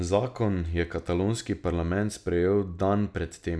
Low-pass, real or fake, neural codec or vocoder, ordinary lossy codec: none; real; none; none